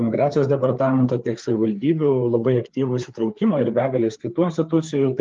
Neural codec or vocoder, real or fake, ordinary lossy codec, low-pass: codec, 16 kHz, 4 kbps, FreqCodec, larger model; fake; Opus, 32 kbps; 7.2 kHz